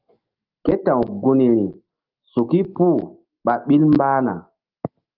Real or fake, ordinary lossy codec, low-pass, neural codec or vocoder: fake; Opus, 32 kbps; 5.4 kHz; autoencoder, 48 kHz, 128 numbers a frame, DAC-VAE, trained on Japanese speech